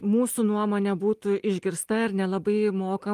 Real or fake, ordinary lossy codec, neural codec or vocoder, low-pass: real; Opus, 32 kbps; none; 14.4 kHz